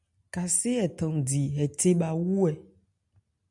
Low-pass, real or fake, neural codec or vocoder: 10.8 kHz; real; none